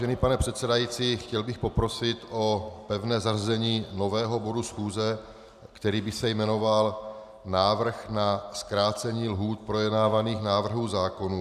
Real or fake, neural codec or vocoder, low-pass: real; none; 14.4 kHz